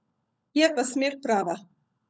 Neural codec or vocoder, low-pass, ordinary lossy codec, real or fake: codec, 16 kHz, 16 kbps, FunCodec, trained on LibriTTS, 50 frames a second; none; none; fake